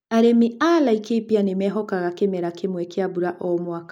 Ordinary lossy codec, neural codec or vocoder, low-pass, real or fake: none; none; 19.8 kHz; real